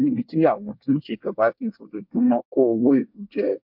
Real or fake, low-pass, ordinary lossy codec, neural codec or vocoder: fake; 5.4 kHz; MP3, 48 kbps; codec, 24 kHz, 1 kbps, SNAC